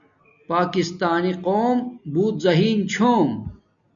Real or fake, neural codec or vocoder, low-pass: real; none; 7.2 kHz